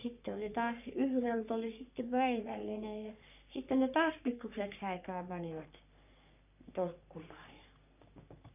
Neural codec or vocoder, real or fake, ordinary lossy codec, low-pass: codec, 44.1 kHz, 3.4 kbps, Pupu-Codec; fake; none; 3.6 kHz